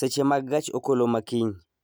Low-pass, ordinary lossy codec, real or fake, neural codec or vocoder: none; none; real; none